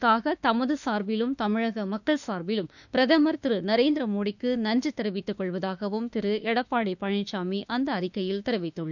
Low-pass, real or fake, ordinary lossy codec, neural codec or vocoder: 7.2 kHz; fake; none; autoencoder, 48 kHz, 32 numbers a frame, DAC-VAE, trained on Japanese speech